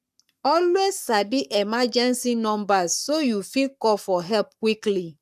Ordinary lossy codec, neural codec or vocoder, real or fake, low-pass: none; codec, 44.1 kHz, 7.8 kbps, Pupu-Codec; fake; 14.4 kHz